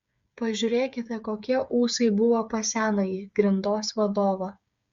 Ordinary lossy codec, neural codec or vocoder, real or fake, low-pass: Opus, 64 kbps; codec, 16 kHz, 8 kbps, FreqCodec, smaller model; fake; 7.2 kHz